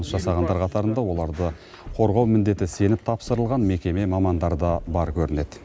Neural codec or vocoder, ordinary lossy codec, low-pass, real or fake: none; none; none; real